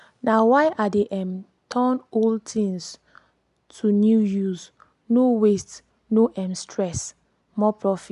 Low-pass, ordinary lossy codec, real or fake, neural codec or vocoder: 10.8 kHz; none; real; none